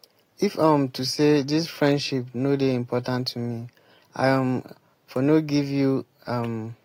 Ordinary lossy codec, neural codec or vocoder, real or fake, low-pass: AAC, 48 kbps; none; real; 19.8 kHz